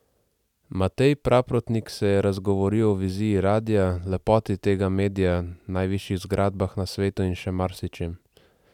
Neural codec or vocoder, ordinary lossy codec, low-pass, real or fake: none; none; 19.8 kHz; real